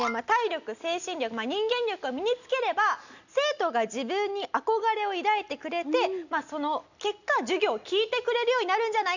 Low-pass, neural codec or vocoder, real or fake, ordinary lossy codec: 7.2 kHz; none; real; none